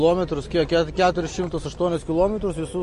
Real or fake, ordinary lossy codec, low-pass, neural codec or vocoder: real; MP3, 48 kbps; 14.4 kHz; none